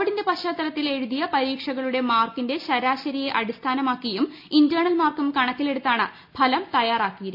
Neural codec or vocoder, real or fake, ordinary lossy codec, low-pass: none; real; MP3, 48 kbps; 5.4 kHz